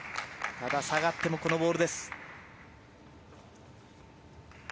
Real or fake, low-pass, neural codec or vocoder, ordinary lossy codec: real; none; none; none